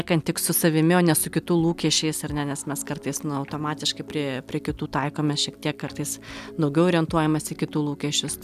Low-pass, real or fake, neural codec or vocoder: 14.4 kHz; real; none